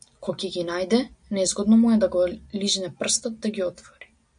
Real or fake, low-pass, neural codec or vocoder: real; 9.9 kHz; none